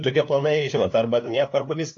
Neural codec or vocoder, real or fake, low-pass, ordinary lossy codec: codec, 16 kHz, 2 kbps, FunCodec, trained on LibriTTS, 25 frames a second; fake; 7.2 kHz; AAC, 48 kbps